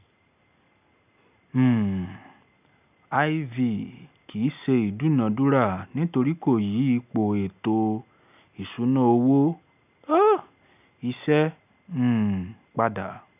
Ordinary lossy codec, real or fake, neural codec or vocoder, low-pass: none; real; none; 3.6 kHz